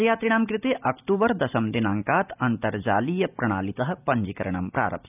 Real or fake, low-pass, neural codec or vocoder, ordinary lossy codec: real; 3.6 kHz; none; none